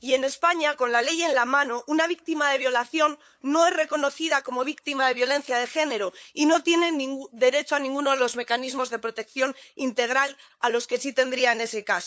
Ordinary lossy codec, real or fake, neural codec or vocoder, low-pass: none; fake; codec, 16 kHz, 8 kbps, FunCodec, trained on LibriTTS, 25 frames a second; none